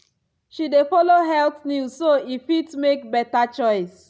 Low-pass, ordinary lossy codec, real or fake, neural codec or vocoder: none; none; real; none